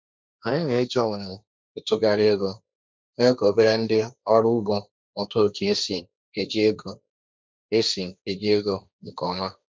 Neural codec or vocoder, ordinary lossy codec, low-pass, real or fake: codec, 16 kHz, 1.1 kbps, Voila-Tokenizer; none; none; fake